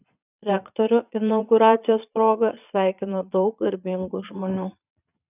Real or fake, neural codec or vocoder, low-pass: fake; vocoder, 22.05 kHz, 80 mel bands, Vocos; 3.6 kHz